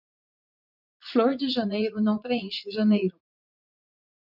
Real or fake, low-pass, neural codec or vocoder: fake; 5.4 kHz; vocoder, 44.1 kHz, 80 mel bands, Vocos